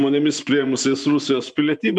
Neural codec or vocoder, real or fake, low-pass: vocoder, 24 kHz, 100 mel bands, Vocos; fake; 10.8 kHz